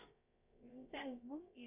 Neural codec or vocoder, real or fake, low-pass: codec, 16 kHz, about 1 kbps, DyCAST, with the encoder's durations; fake; 3.6 kHz